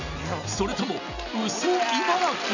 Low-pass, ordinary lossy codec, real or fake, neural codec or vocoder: 7.2 kHz; none; real; none